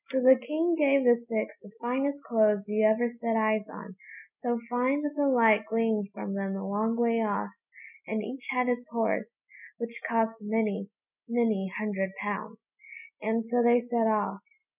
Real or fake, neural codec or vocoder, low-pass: real; none; 3.6 kHz